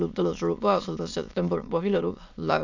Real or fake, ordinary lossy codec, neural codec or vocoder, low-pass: fake; none; autoencoder, 22.05 kHz, a latent of 192 numbers a frame, VITS, trained on many speakers; 7.2 kHz